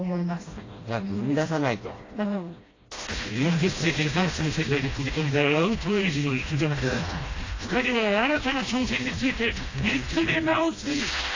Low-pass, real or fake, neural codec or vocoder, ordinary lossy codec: 7.2 kHz; fake; codec, 16 kHz, 1 kbps, FreqCodec, smaller model; AAC, 32 kbps